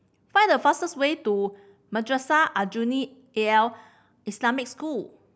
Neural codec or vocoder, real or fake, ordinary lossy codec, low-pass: none; real; none; none